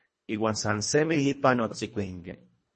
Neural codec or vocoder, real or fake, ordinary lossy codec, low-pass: codec, 24 kHz, 1.5 kbps, HILCodec; fake; MP3, 32 kbps; 10.8 kHz